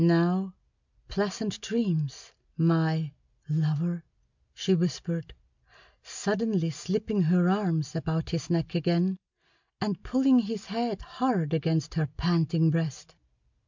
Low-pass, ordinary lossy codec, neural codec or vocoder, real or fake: 7.2 kHz; MP3, 64 kbps; none; real